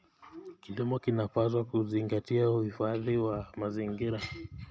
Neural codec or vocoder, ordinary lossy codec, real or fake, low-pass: none; none; real; none